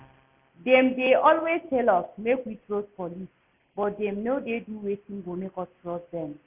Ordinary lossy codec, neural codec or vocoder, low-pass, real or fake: none; none; 3.6 kHz; real